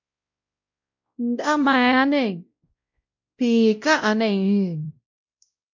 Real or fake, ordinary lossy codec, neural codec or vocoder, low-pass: fake; MP3, 48 kbps; codec, 16 kHz, 0.5 kbps, X-Codec, WavLM features, trained on Multilingual LibriSpeech; 7.2 kHz